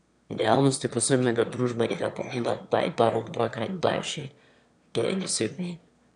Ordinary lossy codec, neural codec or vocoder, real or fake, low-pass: none; autoencoder, 22.05 kHz, a latent of 192 numbers a frame, VITS, trained on one speaker; fake; 9.9 kHz